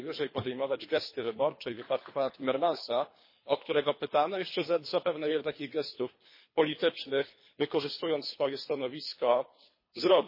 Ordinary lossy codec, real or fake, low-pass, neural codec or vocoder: MP3, 24 kbps; fake; 5.4 kHz; codec, 24 kHz, 3 kbps, HILCodec